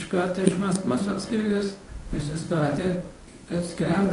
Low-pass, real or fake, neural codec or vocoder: 10.8 kHz; fake; codec, 24 kHz, 0.9 kbps, WavTokenizer, medium speech release version 1